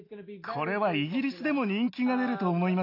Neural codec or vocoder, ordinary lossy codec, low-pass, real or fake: none; AAC, 32 kbps; 5.4 kHz; real